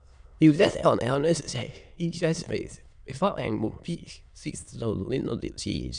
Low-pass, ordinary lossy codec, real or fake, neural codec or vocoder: 9.9 kHz; none; fake; autoencoder, 22.05 kHz, a latent of 192 numbers a frame, VITS, trained on many speakers